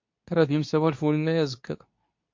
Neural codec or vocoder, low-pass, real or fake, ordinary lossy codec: codec, 24 kHz, 0.9 kbps, WavTokenizer, medium speech release version 2; 7.2 kHz; fake; MP3, 48 kbps